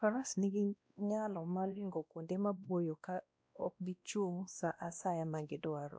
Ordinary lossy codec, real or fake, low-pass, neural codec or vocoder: none; fake; none; codec, 16 kHz, 1 kbps, X-Codec, WavLM features, trained on Multilingual LibriSpeech